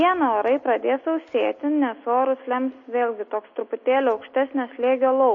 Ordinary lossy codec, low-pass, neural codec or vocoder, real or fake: MP3, 32 kbps; 7.2 kHz; none; real